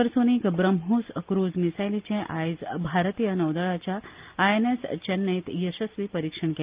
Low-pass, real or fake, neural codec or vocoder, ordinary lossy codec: 3.6 kHz; real; none; Opus, 32 kbps